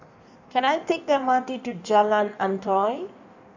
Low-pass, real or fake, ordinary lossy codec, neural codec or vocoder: 7.2 kHz; fake; none; codec, 16 kHz in and 24 kHz out, 1.1 kbps, FireRedTTS-2 codec